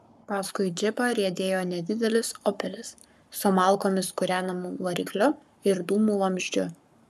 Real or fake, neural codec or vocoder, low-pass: fake; codec, 44.1 kHz, 7.8 kbps, Pupu-Codec; 14.4 kHz